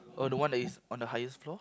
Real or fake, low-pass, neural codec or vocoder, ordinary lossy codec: real; none; none; none